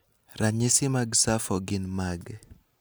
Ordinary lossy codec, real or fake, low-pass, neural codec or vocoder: none; real; none; none